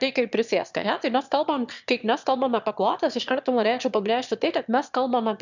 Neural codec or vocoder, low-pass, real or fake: autoencoder, 22.05 kHz, a latent of 192 numbers a frame, VITS, trained on one speaker; 7.2 kHz; fake